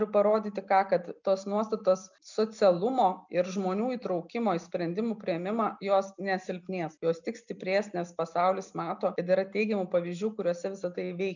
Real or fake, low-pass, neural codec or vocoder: real; 7.2 kHz; none